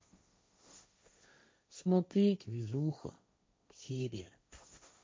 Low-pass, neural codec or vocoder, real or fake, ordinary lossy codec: none; codec, 16 kHz, 1.1 kbps, Voila-Tokenizer; fake; none